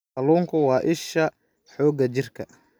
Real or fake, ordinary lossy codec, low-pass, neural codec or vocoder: real; none; none; none